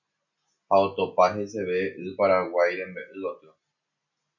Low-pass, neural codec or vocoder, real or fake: 7.2 kHz; none; real